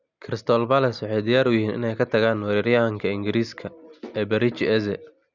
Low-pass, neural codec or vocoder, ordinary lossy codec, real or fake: 7.2 kHz; none; none; real